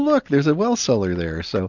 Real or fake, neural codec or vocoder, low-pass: real; none; 7.2 kHz